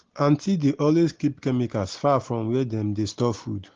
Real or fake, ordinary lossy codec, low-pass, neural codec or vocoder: real; Opus, 16 kbps; 7.2 kHz; none